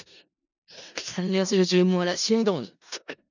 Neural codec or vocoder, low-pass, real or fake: codec, 16 kHz in and 24 kHz out, 0.4 kbps, LongCat-Audio-Codec, four codebook decoder; 7.2 kHz; fake